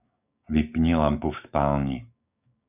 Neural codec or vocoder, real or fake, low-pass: codec, 16 kHz, 6 kbps, DAC; fake; 3.6 kHz